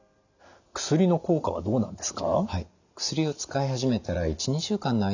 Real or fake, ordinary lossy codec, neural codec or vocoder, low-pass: real; none; none; 7.2 kHz